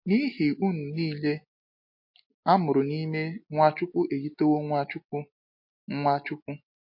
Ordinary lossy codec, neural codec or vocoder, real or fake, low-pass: MP3, 32 kbps; none; real; 5.4 kHz